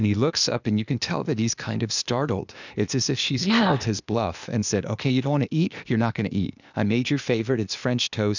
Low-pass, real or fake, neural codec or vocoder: 7.2 kHz; fake; codec, 16 kHz, 0.8 kbps, ZipCodec